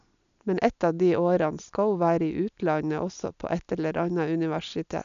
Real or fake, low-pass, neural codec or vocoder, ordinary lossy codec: real; 7.2 kHz; none; none